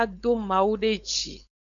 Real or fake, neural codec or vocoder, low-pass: fake; codec, 16 kHz, 4.8 kbps, FACodec; 7.2 kHz